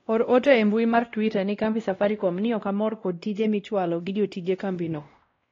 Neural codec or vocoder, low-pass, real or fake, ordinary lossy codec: codec, 16 kHz, 1 kbps, X-Codec, WavLM features, trained on Multilingual LibriSpeech; 7.2 kHz; fake; AAC, 32 kbps